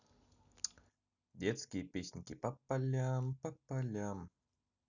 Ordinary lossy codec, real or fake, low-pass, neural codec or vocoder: none; real; 7.2 kHz; none